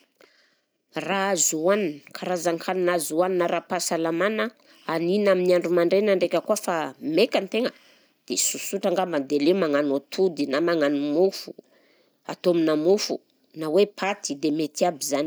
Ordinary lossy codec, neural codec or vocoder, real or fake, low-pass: none; none; real; none